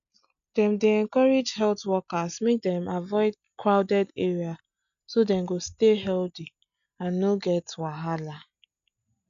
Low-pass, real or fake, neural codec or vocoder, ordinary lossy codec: 7.2 kHz; real; none; none